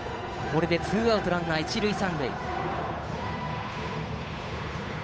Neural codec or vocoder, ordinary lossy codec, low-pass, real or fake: codec, 16 kHz, 8 kbps, FunCodec, trained on Chinese and English, 25 frames a second; none; none; fake